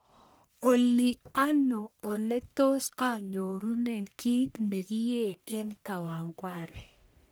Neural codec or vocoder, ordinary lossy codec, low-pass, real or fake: codec, 44.1 kHz, 1.7 kbps, Pupu-Codec; none; none; fake